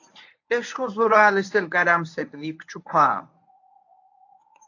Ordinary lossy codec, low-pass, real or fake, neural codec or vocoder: AAC, 48 kbps; 7.2 kHz; fake; codec, 24 kHz, 0.9 kbps, WavTokenizer, medium speech release version 1